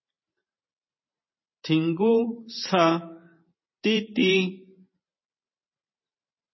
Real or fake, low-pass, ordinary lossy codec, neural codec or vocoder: fake; 7.2 kHz; MP3, 24 kbps; vocoder, 44.1 kHz, 128 mel bands every 512 samples, BigVGAN v2